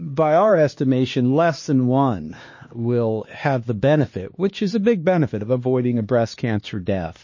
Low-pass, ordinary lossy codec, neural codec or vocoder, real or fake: 7.2 kHz; MP3, 32 kbps; codec, 16 kHz, 2 kbps, X-Codec, HuBERT features, trained on LibriSpeech; fake